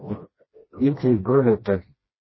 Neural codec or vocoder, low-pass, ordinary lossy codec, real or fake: codec, 16 kHz, 1 kbps, FreqCodec, smaller model; 7.2 kHz; MP3, 24 kbps; fake